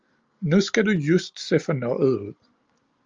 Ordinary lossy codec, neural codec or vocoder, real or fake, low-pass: Opus, 32 kbps; none; real; 7.2 kHz